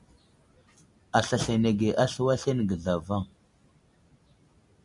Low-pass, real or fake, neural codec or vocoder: 10.8 kHz; real; none